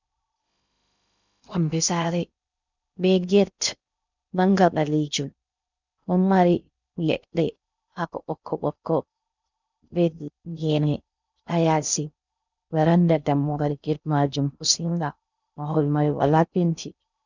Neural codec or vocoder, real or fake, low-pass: codec, 16 kHz in and 24 kHz out, 0.6 kbps, FocalCodec, streaming, 2048 codes; fake; 7.2 kHz